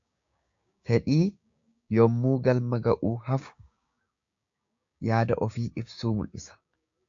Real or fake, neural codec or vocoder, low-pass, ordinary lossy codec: fake; codec, 16 kHz, 6 kbps, DAC; 7.2 kHz; AAC, 64 kbps